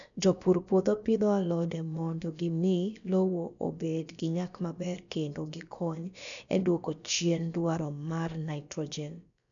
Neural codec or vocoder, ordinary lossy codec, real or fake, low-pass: codec, 16 kHz, about 1 kbps, DyCAST, with the encoder's durations; none; fake; 7.2 kHz